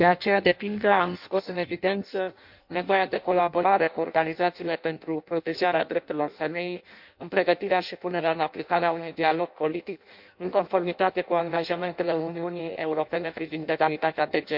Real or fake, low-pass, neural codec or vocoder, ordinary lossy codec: fake; 5.4 kHz; codec, 16 kHz in and 24 kHz out, 0.6 kbps, FireRedTTS-2 codec; MP3, 48 kbps